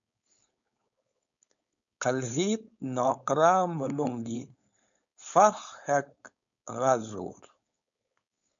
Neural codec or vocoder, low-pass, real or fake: codec, 16 kHz, 4.8 kbps, FACodec; 7.2 kHz; fake